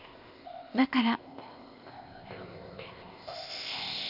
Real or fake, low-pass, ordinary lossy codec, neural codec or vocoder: fake; 5.4 kHz; none; codec, 16 kHz, 0.8 kbps, ZipCodec